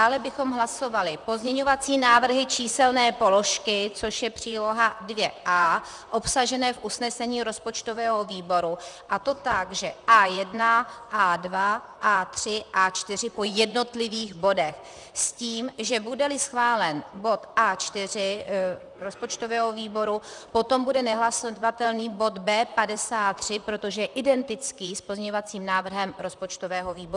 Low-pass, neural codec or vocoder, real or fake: 10.8 kHz; vocoder, 44.1 kHz, 128 mel bands, Pupu-Vocoder; fake